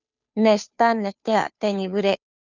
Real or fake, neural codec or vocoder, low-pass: fake; codec, 16 kHz, 2 kbps, FunCodec, trained on Chinese and English, 25 frames a second; 7.2 kHz